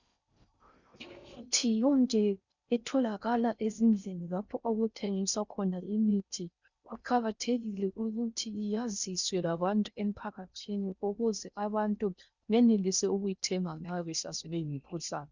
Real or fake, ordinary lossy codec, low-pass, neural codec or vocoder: fake; Opus, 64 kbps; 7.2 kHz; codec, 16 kHz in and 24 kHz out, 0.6 kbps, FocalCodec, streaming, 4096 codes